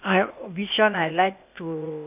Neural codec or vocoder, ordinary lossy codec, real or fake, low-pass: codec, 16 kHz, 0.8 kbps, ZipCodec; none; fake; 3.6 kHz